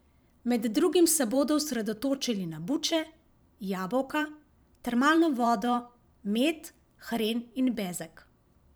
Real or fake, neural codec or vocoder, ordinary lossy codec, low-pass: fake; vocoder, 44.1 kHz, 128 mel bands every 512 samples, BigVGAN v2; none; none